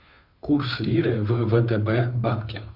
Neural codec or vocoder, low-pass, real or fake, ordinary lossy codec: codec, 16 kHz, 2 kbps, FunCodec, trained on Chinese and English, 25 frames a second; 5.4 kHz; fake; Opus, 64 kbps